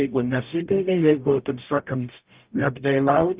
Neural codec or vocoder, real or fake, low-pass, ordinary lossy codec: codec, 44.1 kHz, 0.9 kbps, DAC; fake; 3.6 kHz; Opus, 24 kbps